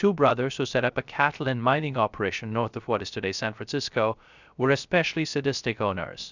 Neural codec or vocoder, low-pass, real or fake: codec, 16 kHz, 0.3 kbps, FocalCodec; 7.2 kHz; fake